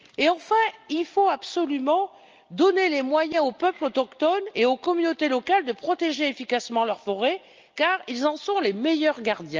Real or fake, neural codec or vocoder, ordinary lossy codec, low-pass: real; none; Opus, 24 kbps; 7.2 kHz